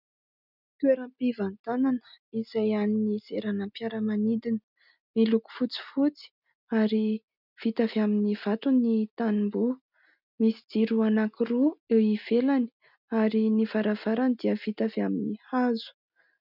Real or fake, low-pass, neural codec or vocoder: real; 5.4 kHz; none